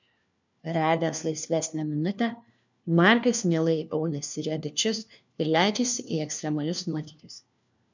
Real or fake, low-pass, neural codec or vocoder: fake; 7.2 kHz; codec, 16 kHz, 1 kbps, FunCodec, trained on LibriTTS, 50 frames a second